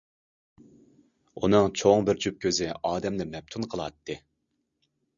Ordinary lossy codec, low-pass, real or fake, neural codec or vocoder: Opus, 64 kbps; 7.2 kHz; real; none